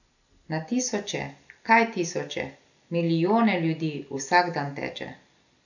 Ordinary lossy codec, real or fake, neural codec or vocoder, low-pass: none; real; none; 7.2 kHz